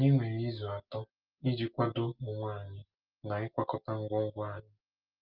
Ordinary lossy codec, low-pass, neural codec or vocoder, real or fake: Opus, 32 kbps; 5.4 kHz; none; real